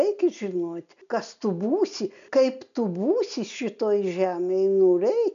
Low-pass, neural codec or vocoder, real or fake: 7.2 kHz; none; real